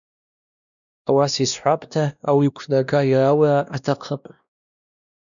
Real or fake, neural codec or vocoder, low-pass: fake; codec, 16 kHz, 1 kbps, X-Codec, WavLM features, trained on Multilingual LibriSpeech; 7.2 kHz